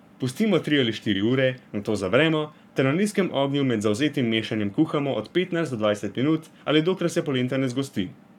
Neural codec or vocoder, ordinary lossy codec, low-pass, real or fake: codec, 44.1 kHz, 7.8 kbps, Pupu-Codec; none; 19.8 kHz; fake